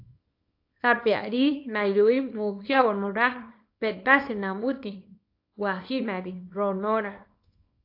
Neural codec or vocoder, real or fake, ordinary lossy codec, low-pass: codec, 24 kHz, 0.9 kbps, WavTokenizer, small release; fake; AAC, 32 kbps; 5.4 kHz